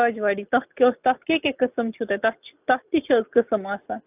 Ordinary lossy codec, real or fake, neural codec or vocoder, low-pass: AAC, 32 kbps; real; none; 3.6 kHz